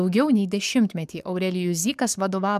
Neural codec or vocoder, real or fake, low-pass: codec, 44.1 kHz, 7.8 kbps, DAC; fake; 14.4 kHz